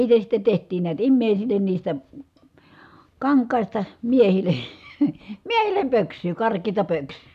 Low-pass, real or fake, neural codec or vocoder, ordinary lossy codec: 14.4 kHz; real; none; none